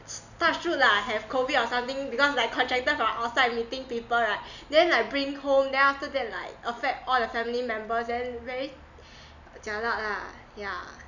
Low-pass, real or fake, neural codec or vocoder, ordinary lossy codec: 7.2 kHz; real; none; none